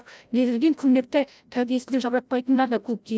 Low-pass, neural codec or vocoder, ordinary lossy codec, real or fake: none; codec, 16 kHz, 0.5 kbps, FreqCodec, larger model; none; fake